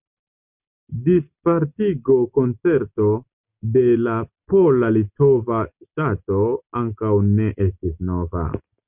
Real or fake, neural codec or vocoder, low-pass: real; none; 3.6 kHz